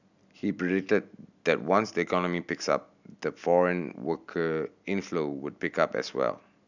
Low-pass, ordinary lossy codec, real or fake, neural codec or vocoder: 7.2 kHz; none; real; none